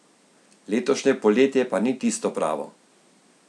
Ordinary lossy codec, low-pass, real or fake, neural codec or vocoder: none; none; real; none